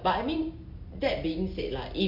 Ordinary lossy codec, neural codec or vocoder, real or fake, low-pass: MP3, 48 kbps; none; real; 5.4 kHz